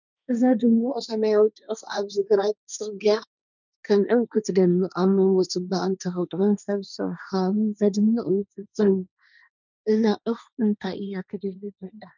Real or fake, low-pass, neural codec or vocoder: fake; 7.2 kHz; codec, 16 kHz, 1.1 kbps, Voila-Tokenizer